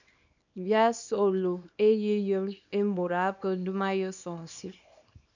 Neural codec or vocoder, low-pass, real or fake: codec, 24 kHz, 0.9 kbps, WavTokenizer, small release; 7.2 kHz; fake